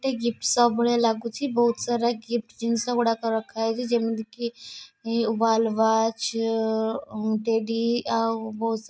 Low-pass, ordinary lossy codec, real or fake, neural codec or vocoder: none; none; real; none